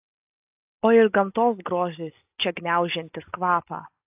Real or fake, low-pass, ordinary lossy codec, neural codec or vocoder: real; 3.6 kHz; AAC, 32 kbps; none